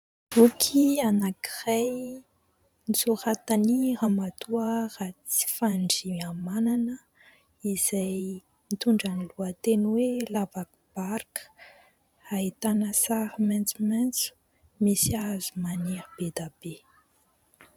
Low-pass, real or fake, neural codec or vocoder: 19.8 kHz; fake; vocoder, 44.1 kHz, 128 mel bands every 512 samples, BigVGAN v2